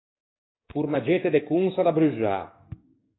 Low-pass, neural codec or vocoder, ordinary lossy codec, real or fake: 7.2 kHz; codec, 44.1 kHz, 7.8 kbps, DAC; AAC, 16 kbps; fake